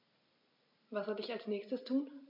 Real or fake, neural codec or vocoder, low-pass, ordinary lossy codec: real; none; 5.4 kHz; MP3, 48 kbps